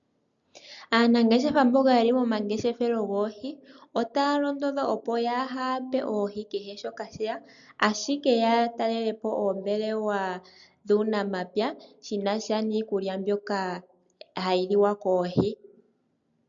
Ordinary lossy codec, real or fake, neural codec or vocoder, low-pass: MP3, 96 kbps; real; none; 7.2 kHz